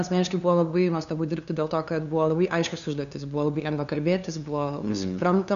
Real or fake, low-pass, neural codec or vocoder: fake; 7.2 kHz; codec, 16 kHz, 2 kbps, FunCodec, trained on LibriTTS, 25 frames a second